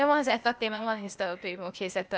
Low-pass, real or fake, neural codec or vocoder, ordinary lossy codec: none; fake; codec, 16 kHz, 0.8 kbps, ZipCodec; none